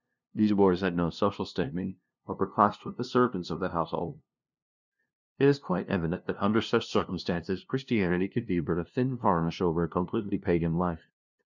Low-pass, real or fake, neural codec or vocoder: 7.2 kHz; fake; codec, 16 kHz, 0.5 kbps, FunCodec, trained on LibriTTS, 25 frames a second